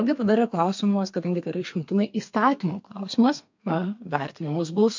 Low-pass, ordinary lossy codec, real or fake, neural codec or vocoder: 7.2 kHz; MP3, 48 kbps; fake; codec, 32 kHz, 1.9 kbps, SNAC